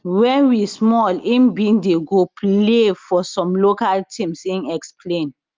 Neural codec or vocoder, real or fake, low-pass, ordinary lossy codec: none; real; 7.2 kHz; Opus, 32 kbps